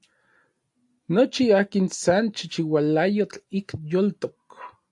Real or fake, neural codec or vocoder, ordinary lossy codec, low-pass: real; none; AAC, 64 kbps; 10.8 kHz